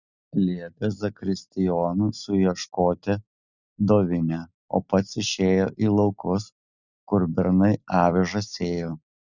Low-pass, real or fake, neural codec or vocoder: 7.2 kHz; real; none